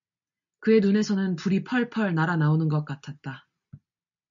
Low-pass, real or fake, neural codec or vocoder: 7.2 kHz; real; none